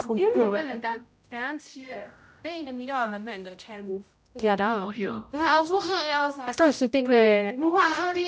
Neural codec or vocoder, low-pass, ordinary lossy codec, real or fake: codec, 16 kHz, 0.5 kbps, X-Codec, HuBERT features, trained on general audio; none; none; fake